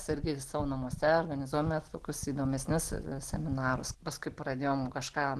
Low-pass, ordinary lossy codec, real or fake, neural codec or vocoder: 10.8 kHz; Opus, 16 kbps; real; none